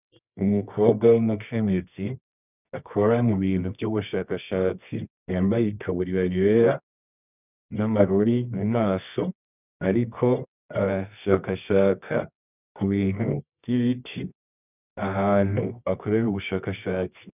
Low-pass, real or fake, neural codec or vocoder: 3.6 kHz; fake; codec, 24 kHz, 0.9 kbps, WavTokenizer, medium music audio release